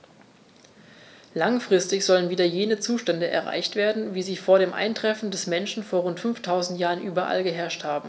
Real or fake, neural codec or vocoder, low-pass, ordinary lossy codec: real; none; none; none